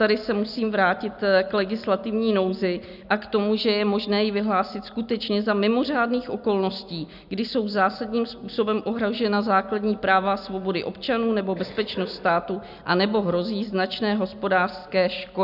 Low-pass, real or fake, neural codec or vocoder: 5.4 kHz; real; none